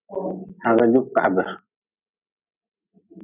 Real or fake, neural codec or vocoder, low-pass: real; none; 3.6 kHz